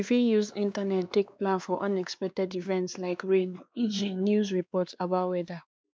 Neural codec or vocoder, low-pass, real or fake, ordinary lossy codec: codec, 16 kHz, 2 kbps, X-Codec, WavLM features, trained on Multilingual LibriSpeech; none; fake; none